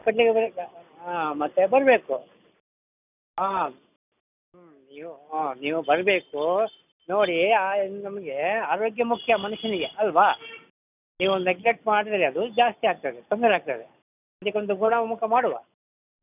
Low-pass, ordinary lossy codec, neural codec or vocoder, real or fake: 3.6 kHz; none; none; real